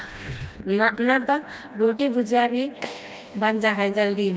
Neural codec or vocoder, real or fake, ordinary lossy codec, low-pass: codec, 16 kHz, 1 kbps, FreqCodec, smaller model; fake; none; none